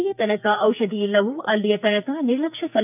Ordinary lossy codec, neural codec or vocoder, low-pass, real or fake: none; codec, 44.1 kHz, 2.6 kbps, SNAC; 3.6 kHz; fake